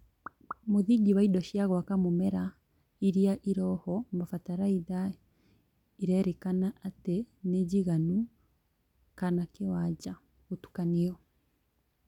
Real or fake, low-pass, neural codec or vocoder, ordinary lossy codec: real; 19.8 kHz; none; none